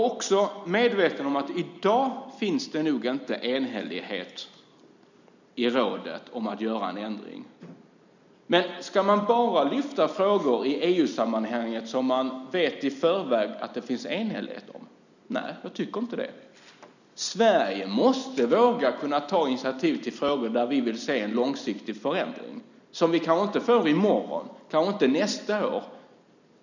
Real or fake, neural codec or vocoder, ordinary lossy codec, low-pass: real; none; none; 7.2 kHz